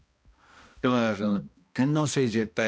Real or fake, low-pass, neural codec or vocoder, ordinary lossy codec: fake; none; codec, 16 kHz, 1 kbps, X-Codec, HuBERT features, trained on balanced general audio; none